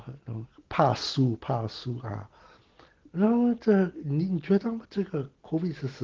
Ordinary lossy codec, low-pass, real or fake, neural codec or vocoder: Opus, 16 kbps; 7.2 kHz; real; none